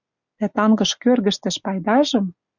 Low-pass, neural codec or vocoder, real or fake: 7.2 kHz; none; real